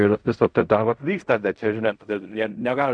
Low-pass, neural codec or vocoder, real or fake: 9.9 kHz; codec, 16 kHz in and 24 kHz out, 0.4 kbps, LongCat-Audio-Codec, fine tuned four codebook decoder; fake